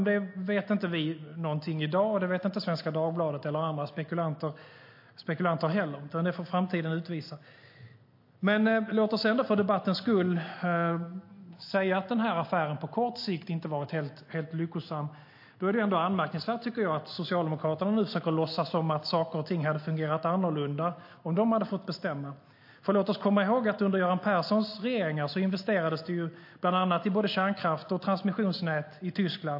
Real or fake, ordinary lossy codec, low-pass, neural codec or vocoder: real; MP3, 32 kbps; 5.4 kHz; none